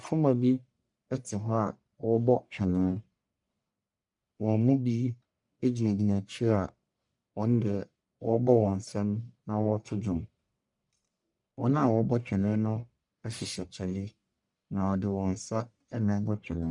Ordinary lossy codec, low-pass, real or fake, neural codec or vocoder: MP3, 96 kbps; 10.8 kHz; fake; codec, 44.1 kHz, 1.7 kbps, Pupu-Codec